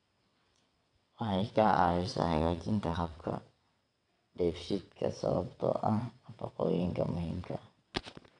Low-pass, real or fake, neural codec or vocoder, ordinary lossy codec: 9.9 kHz; fake; vocoder, 22.05 kHz, 80 mel bands, WaveNeXt; none